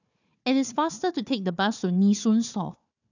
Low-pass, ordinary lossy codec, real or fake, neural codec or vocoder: 7.2 kHz; none; fake; codec, 16 kHz, 4 kbps, FunCodec, trained on Chinese and English, 50 frames a second